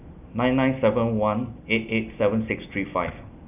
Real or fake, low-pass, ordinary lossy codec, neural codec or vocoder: real; 3.6 kHz; none; none